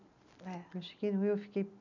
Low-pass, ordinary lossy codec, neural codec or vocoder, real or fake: 7.2 kHz; none; none; real